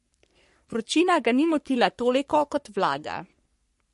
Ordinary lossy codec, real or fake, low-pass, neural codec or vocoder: MP3, 48 kbps; fake; 14.4 kHz; codec, 44.1 kHz, 3.4 kbps, Pupu-Codec